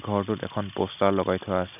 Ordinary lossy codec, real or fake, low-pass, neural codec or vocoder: none; real; 3.6 kHz; none